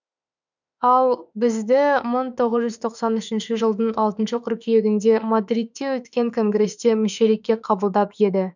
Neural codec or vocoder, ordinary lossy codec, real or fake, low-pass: autoencoder, 48 kHz, 32 numbers a frame, DAC-VAE, trained on Japanese speech; none; fake; 7.2 kHz